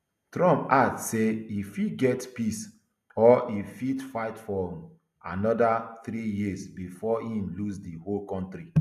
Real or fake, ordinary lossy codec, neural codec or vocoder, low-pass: real; none; none; 14.4 kHz